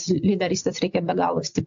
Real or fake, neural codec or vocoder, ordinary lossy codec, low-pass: real; none; AAC, 64 kbps; 7.2 kHz